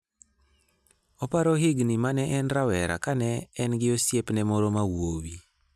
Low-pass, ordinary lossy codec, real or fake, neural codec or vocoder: none; none; real; none